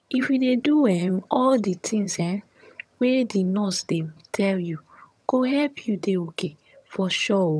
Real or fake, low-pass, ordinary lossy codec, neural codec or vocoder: fake; none; none; vocoder, 22.05 kHz, 80 mel bands, HiFi-GAN